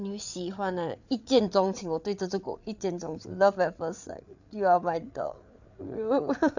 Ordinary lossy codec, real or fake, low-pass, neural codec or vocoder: none; fake; 7.2 kHz; codec, 16 kHz, 8 kbps, FreqCodec, larger model